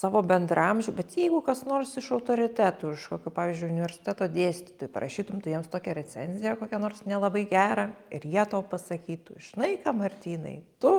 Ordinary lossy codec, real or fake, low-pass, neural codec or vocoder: Opus, 32 kbps; real; 19.8 kHz; none